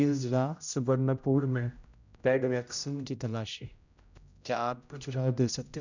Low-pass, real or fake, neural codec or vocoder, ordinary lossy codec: 7.2 kHz; fake; codec, 16 kHz, 0.5 kbps, X-Codec, HuBERT features, trained on general audio; none